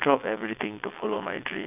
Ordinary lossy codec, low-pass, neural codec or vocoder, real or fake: none; 3.6 kHz; vocoder, 22.05 kHz, 80 mel bands, WaveNeXt; fake